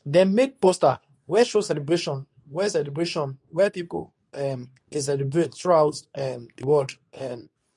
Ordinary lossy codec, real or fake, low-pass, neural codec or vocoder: none; fake; 10.8 kHz; codec, 24 kHz, 0.9 kbps, WavTokenizer, medium speech release version 2